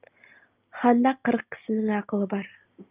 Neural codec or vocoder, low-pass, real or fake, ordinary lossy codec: none; 3.6 kHz; real; Opus, 24 kbps